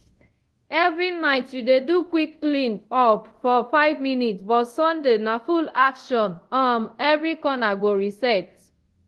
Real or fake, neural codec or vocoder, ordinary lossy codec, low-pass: fake; codec, 24 kHz, 0.5 kbps, DualCodec; Opus, 16 kbps; 10.8 kHz